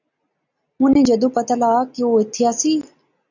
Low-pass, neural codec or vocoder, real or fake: 7.2 kHz; none; real